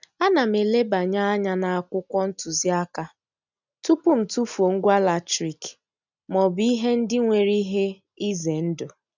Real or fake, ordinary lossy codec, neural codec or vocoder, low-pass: real; none; none; 7.2 kHz